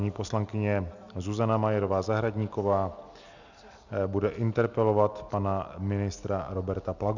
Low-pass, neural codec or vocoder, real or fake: 7.2 kHz; none; real